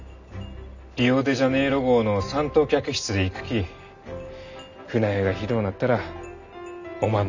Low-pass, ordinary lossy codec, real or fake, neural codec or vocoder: 7.2 kHz; none; real; none